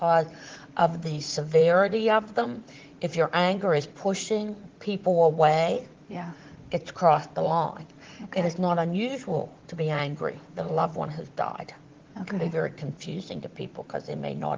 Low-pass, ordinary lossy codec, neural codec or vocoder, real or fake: 7.2 kHz; Opus, 16 kbps; vocoder, 44.1 kHz, 80 mel bands, Vocos; fake